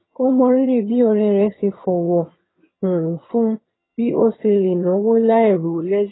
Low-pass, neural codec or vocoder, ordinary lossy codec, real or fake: 7.2 kHz; vocoder, 22.05 kHz, 80 mel bands, HiFi-GAN; AAC, 16 kbps; fake